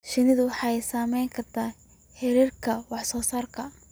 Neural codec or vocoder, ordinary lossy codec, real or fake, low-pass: none; none; real; none